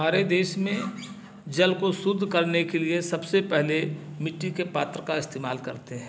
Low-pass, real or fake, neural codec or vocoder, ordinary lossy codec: none; real; none; none